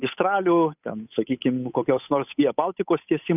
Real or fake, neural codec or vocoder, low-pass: fake; codec, 16 kHz, 8 kbps, FunCodec, trained on Chinese and English, 25 frames a second; 3.6 kHz